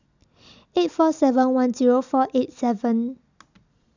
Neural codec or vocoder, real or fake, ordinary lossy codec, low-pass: none; real; none; 7.2 kHz